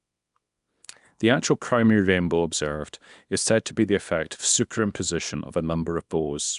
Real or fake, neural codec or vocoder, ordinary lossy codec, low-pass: fake; codec, 24 kHz, 0.9 kbps, WavTokenizer, small release; none; 10.8 kHz